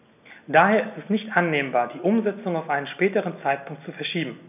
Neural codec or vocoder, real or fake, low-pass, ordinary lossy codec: none; real; 3.6 kHz; none